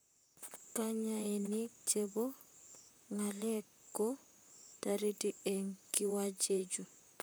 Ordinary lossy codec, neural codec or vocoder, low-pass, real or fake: none; vocoder, 44.1 kHz, 128 mel bands, Pupu-Vocoder; none; fake